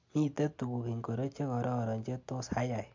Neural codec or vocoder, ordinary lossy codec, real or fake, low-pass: none; MP3, 48 kbps; real; 7.2 kHz